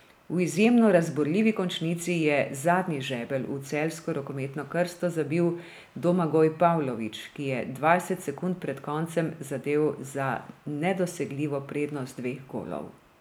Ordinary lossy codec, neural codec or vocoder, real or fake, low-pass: none; none; real; none